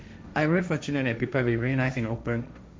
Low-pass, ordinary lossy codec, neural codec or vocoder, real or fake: none; none; codec, 16 kHz, 1.1 kbps, Voila-Tokenizer; fake